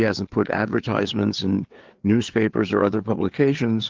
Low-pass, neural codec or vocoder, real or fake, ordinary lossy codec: 7.2 kHz; codec, 16 kHz, 4 kbps, FreqCodec, larger model; fake; Opus, 16 kbps